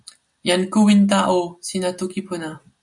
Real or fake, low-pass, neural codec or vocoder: real; 10.8 kHz; none